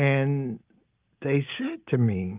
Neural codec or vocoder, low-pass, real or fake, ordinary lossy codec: none; 3.6 kHz; real; Opus, 32 kbps